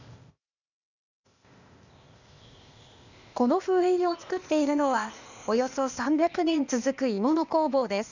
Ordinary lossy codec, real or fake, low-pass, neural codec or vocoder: none; fake; 7.2 kHz; codec, 16 kHz, 0.8 kbps, ZipCodec